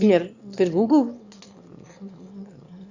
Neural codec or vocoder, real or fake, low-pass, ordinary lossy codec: autoencoder, 22.05 kHz, a latent of 192 numbers a frame, VITS, trained on one speaker; fake; 7.2 kHz; Opus, 64 kbps